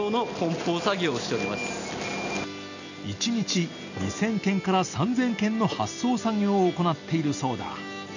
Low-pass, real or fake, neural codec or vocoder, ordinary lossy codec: 7.2 kHz; real; none; none